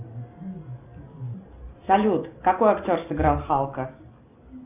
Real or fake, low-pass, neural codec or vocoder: real; 3.6 kHz; none